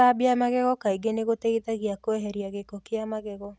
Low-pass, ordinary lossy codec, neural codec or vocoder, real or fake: none; none; none; real